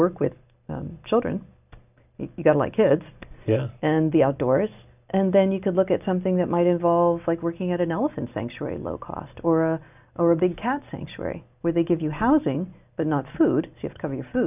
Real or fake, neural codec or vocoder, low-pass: real; none; 3.6 kHz